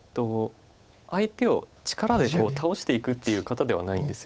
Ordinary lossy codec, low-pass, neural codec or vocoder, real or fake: none; none; codec, 16 kHz, 8 kbps, FunCodec, trained on Chinese and English, 25 frames a second; fake